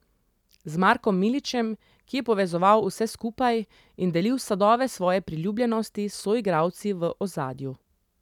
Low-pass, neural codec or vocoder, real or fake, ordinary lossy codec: 19.8 kHz; none; real; none